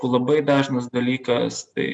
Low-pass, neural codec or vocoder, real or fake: 9.9 kHz; none; real